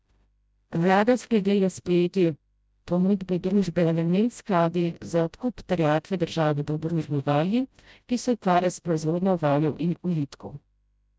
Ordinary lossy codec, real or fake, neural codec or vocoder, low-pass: none; fake; codec, 16 kHz, 0.5 kbps, FreqCodec, smaller model; none